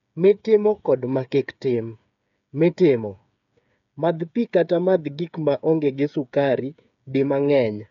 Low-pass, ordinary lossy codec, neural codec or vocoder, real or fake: 7.2 kHz; none; codec, 16 kHz, 8 kbps, FreqCodec, smaller model; fake